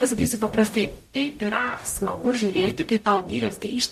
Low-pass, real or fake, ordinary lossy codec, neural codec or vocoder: 14.4 kHz; fake; MP3, 64 kbps; codec, 44.1 kHz, 0.9 kbps, DAC